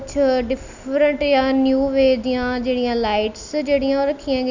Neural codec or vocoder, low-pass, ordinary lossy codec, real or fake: none; 7.2 kHz; none; real